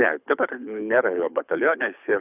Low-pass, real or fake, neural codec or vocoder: 3.6 kHz; fake; codec, 24 kHz, 6 kbps, HILCodec